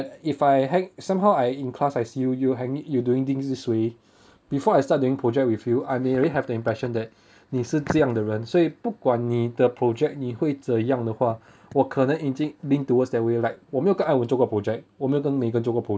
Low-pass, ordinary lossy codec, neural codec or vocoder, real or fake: none; none; none; real